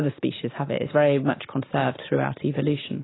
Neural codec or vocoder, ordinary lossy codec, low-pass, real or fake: none; AAC, 16 kbps; 7.2 kHz; real